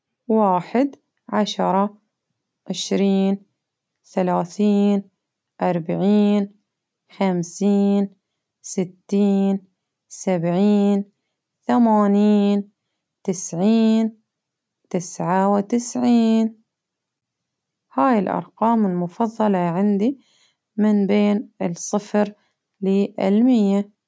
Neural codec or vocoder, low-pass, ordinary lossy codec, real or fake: none; none; none; real